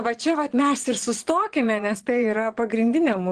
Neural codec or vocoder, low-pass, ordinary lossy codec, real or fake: vocoder, 22.05 kHz, 80 mel bands, Vocos; 9.9 kHz; Opus, 16 kbps; fake